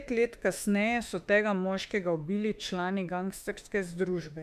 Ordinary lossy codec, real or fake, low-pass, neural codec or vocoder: none; fake; 14.4 kHz; autoencoder, 48 kHz, 32 numbers a frame, DAC-VAE, trained on Japanese speech